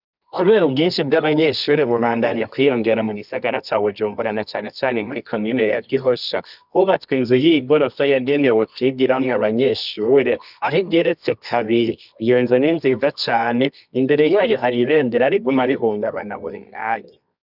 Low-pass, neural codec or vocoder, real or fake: 5.4 kHz; codec, 24 kHz, 0.9 kbps, WavTokenizer, medium music audio release; fake